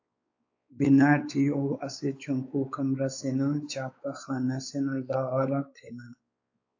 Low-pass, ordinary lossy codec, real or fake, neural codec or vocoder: 7.2 kHz; AAC, 48 kbps; fake; codec, 16 kHz, 4 kbps, X-Codec, WavLM features, trained on Multilingual LibriSpeech